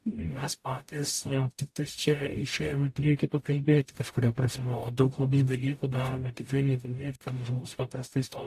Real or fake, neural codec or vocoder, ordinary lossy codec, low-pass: fake; codec, 44.1 kHz, 0.9 kbps, DAC; AAC, 64 kbps; 14.4 kHz